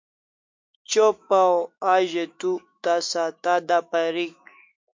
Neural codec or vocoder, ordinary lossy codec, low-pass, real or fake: autoencoder, 48 kHz, 128 numbers a frame, DAC-VAE, trained on Japanese speech; MP3, 48 kbps; 7.2 kHz; fake